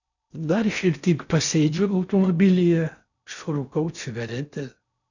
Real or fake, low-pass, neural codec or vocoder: fake; 7.2 kHz; codec, 16 kHz in and 24 kHz out, 0.6 kbps, FocalCodec, streaming, 4096 codes